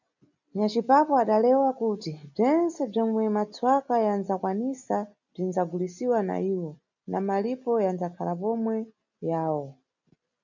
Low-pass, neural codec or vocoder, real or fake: 7.2 kHz; none; real